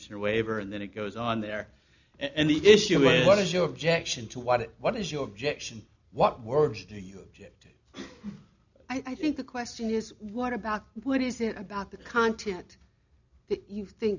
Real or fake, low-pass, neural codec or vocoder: real; 7.2 kHz; none